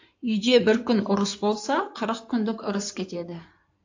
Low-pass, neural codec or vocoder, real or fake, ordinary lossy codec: 7.2 kHz; codec, 16 kHz in and 24 kHz out, 2.2 kbps, FireRedTTS-2 codec; fake; AAC, 48 kbps